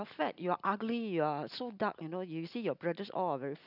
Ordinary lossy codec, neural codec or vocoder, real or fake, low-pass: none; none; real; 5.4 kHz